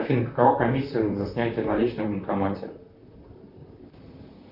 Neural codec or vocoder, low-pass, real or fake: vocoder, 44.1 kHz, 128 mel bands, Pupu-Vocoder; 5.4 kHz; fake